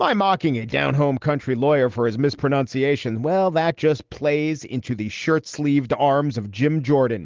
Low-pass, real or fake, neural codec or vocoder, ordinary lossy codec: 7.2 kHz; real; none; Opus, 16 kbps